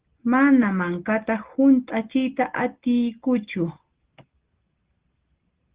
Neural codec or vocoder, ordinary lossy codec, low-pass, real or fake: none; Opus, 16 kbps; 3.6 kHz; real